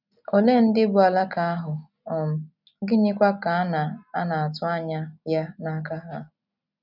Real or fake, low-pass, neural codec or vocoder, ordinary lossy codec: real; 5.4 kHz; none; none